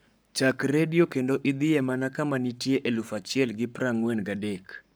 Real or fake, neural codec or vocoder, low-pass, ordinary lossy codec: fake; codec, 44.1 kHz, 7.8 kbps, Pupu-Codec; none; none